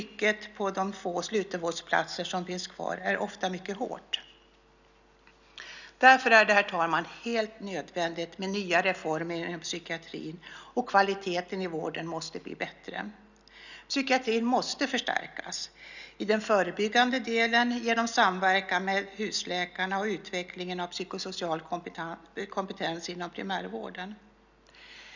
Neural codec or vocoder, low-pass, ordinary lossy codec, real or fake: none; 7.2 kHz; none; real